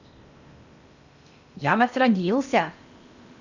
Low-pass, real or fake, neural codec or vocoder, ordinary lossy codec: 7.2 kHz; fake; codec, 16 kHz in and 24 kHz out, 0.8 kbps, FocalCodec, streaming, 65536 codes; none